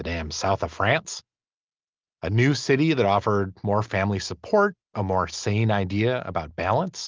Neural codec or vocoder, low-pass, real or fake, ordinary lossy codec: none; 7.2 kHz; real; Opus, 24 kbps